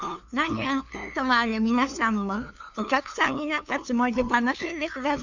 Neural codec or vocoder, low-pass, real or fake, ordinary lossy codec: codec, 16 kHz, 2 kbps, FunCodec, trained on LibriTTS, 25 frames a second; 7.2 kHz; fake; none